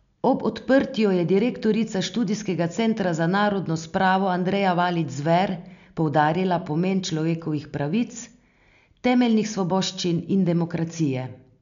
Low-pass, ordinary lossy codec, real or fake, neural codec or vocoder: 7.2 kHz; none; real; none